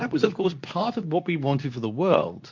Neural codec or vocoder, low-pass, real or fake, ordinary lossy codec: codec, 24 kHz, 0.9 kbps, WavTokenizer, medium speech release version 2; 7.2 kHz; fake; MP3, 48 kbps